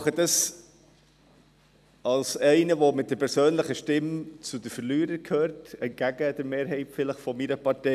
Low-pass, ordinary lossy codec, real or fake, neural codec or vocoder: 14.4 kHz; none; real; none